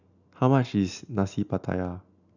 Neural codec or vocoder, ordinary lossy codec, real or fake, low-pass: none; none; real; 7.2 kHz